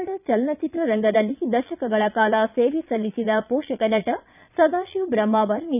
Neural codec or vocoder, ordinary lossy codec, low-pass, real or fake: vocoder, 22.05 kHz, 80 mel bands, Vocos; none; 3.6 kHz; fake